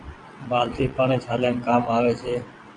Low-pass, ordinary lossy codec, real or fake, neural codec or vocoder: 9.9 kHz; AAC, 64 kbps; fake; vocoder, 22.05 kHz, 80 mel bands, WaveNeXt